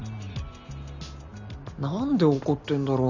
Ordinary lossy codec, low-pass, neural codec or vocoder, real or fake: none; 7.2 kHz; none; real